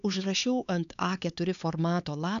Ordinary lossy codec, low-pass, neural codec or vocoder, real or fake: MP3, 96 kbps; 7.2 kHz; codec, 16 kHz, 4 kbps, X-Codec, HuBERT features, trained on LibriSpeech; fake